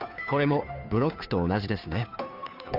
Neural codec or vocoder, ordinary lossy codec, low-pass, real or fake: codec, 16 kHz, 2 kbps, FunCodec, trained on Chinese and English, 25 frames a second; none; 5.4 kHz; fake